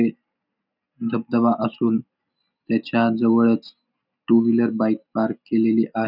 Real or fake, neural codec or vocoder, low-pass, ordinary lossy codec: real; none; 5.4 kHz; none